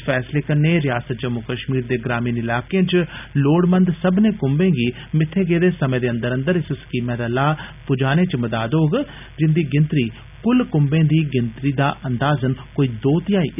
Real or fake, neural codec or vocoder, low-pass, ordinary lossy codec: real; none; 3.6 kHz; none